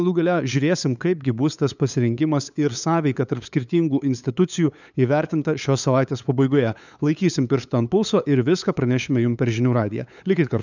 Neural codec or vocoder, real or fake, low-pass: codec, 16 kHz, 8 kbps, FunCodec, trained on LibriTTS, 25 frames a second; fake; 7.2 kHz